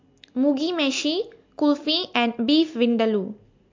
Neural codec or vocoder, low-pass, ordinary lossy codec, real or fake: none; 7.2 kHz; MP3, 48 kbps; real